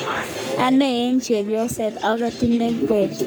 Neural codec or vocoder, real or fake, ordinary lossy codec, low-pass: codec, 44.1 kHz, 3.4 kbps, Pupu-Codec; fake; none; none